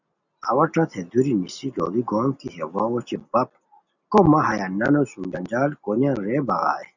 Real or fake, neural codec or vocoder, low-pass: real; none; 7.2 kHz